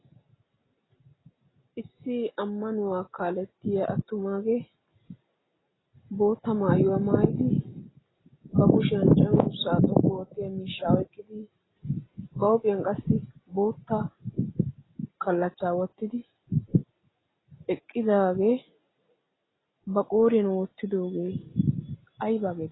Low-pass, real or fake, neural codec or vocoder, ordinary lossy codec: 7.2 kHz; real; none; AAC, 16 kbps